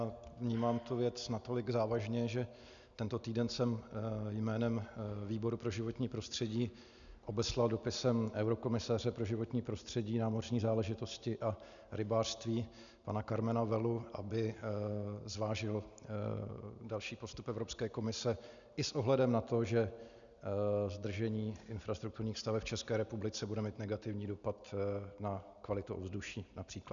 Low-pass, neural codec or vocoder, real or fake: 7.2 kHz; none; real